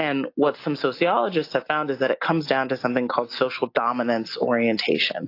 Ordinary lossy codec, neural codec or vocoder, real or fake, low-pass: AAC, 32 kbps; none; real; 5.4 kHz